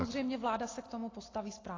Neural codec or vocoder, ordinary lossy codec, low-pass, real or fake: none; AAC, 32 kbps; 7.2 kHz; real